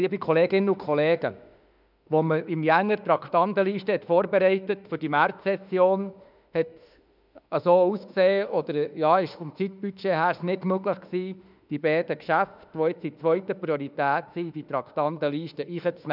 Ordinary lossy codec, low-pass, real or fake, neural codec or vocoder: none; 5.4 kHz; fake; autoencoder, 48 kHz, 32 numbers a frame, DAC-VAE, trained on Japanese speech